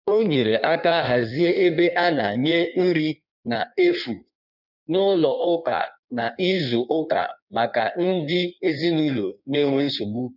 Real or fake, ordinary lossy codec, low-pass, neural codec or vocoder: fake; none; 5.4 kHz; codec, 16 kHz in and 24 kHz out, 1.1 kbps, FireRedTTS-2 codec